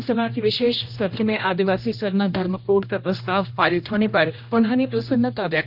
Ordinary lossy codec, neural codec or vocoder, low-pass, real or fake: none; codec, 16 kHz, 1 kbps, X-Codec, HuBERT features, trained on general audio; 5.4 kHz; fake